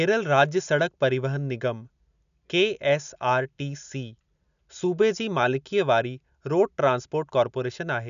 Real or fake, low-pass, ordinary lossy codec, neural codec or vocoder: real; 7.2 kHz; none; none